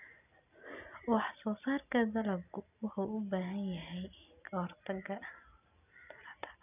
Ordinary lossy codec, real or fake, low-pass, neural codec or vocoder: none; real; 3.6 kHz; none